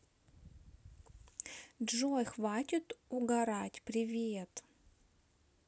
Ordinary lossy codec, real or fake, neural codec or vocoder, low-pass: none; real; none; none